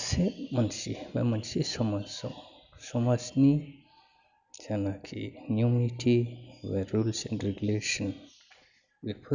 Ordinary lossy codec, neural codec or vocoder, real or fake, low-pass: none; none; real; 7.2 kHz